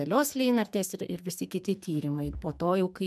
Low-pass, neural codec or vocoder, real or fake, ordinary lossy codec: 14.4 kHz; codec, 44.1 kHz, 2.6 kbps, SNAC; fake; MP3, 96 kbps